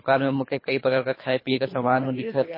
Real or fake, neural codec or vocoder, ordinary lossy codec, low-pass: fake; codec, 24 kHz, 3 kbps, HILCodec; MP3, 24 kbps; 5.4 kHz